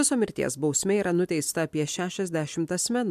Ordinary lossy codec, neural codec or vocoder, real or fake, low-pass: MP3, 96 kbps; none; real; 14.4 kHz